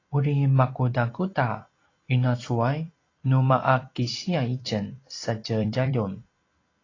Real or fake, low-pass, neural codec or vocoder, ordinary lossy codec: fake; 7.2 kHz; vocoder, 44.1 kHz, 128 mel bands every 256 samples, BigVGAN v2; AAC, 32 kbps